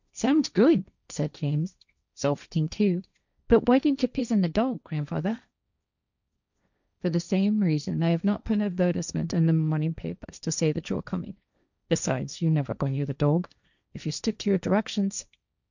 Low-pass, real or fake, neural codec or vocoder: 7.2 kHz; fake; codec, 16 kHz, 1.1 kbps, Voila-Tokenizer